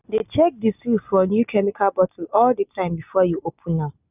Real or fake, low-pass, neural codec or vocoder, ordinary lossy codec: real; 3.6 kHz; none; none